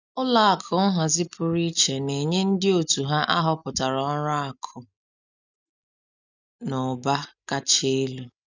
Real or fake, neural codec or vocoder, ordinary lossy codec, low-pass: real; none; none; 7.2 kHz